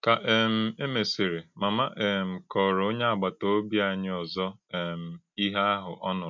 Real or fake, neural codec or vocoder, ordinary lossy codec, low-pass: real; none; MP3, 64 kbps; 7.2 kHz